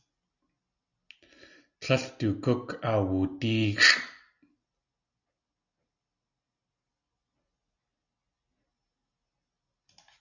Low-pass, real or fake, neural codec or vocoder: 7.2 kHz; real; none